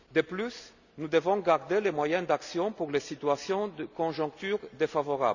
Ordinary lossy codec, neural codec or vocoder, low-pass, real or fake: none; none; 7.2 kHz; real